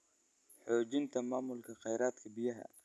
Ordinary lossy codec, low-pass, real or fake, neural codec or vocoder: none; none; real; none